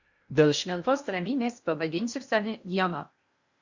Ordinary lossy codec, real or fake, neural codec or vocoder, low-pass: Opus, 64 kbps; fake; codec, 16 kHz in and 24 kHz out, 0.6 kbps, FocalCodec, streaming, 2048 codes; 7.2 kHz